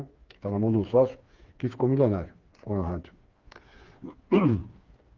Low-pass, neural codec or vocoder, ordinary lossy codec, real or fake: 7.2 kHz; codec, 16 kHz, 4 kbps, FreqCodec, smaller model; Opus, 32 kbps; fake